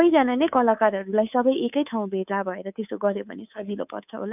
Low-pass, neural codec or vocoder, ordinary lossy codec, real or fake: 3.6 kHz; codec, 24 kHz, 3.1 kbps, DualCodec; none; fake